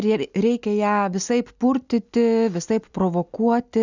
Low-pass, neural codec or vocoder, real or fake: 7.2 kHz; none; real